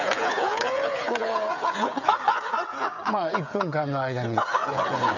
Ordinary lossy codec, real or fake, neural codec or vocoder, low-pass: none; fake; codec, 16 kHz, 8 kbps, FreqCodec, smaller model; 7.2 kHz